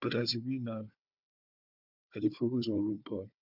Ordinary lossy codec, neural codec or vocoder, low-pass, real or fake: none; codec, 16 kHz, 4 kbps, FunCodec, trained on Chinese and English, 50 frames a second; 5.4 kHz; fake